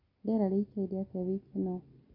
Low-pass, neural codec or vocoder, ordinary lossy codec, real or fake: 5.4 kHz; none; AAC, 24 kbps; real